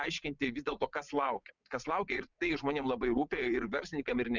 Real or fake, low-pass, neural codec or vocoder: fake; 7.2 kHz; vocoder, 22.05 kHz, 80 mel bands, WaveNeXt